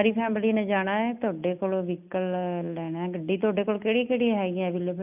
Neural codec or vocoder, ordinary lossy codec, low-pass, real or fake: none; none; 3.6 kHz; real